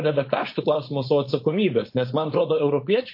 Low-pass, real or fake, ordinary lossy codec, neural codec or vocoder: 5.4 kHz; fake; MP3, 32 kbps; codec, 16 kHz, 4.8 kbps, FACodec